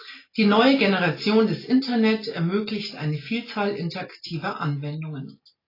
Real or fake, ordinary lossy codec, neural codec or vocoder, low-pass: real; AAC, 24 kbps; none; 5.4 kHz